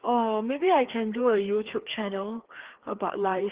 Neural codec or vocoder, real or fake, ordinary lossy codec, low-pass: codec, 16 kHz, 2 kbps, FreqCodec, larger model; fake; Opus, 16 kbps; 3.6 kHz